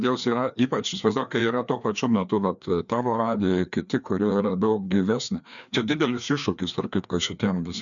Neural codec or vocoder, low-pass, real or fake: codec, 16 kHz, 2 kbps, FreqCodec, larger model; 7.2 kHz; fake